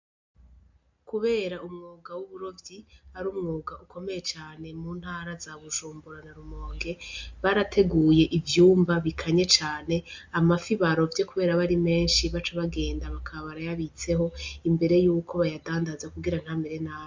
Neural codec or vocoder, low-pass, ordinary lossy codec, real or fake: none; 7.2 kHz; MP3, 48 kbps; real